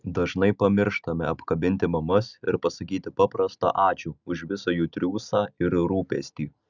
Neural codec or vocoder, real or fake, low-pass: none; real; 7.2 kHz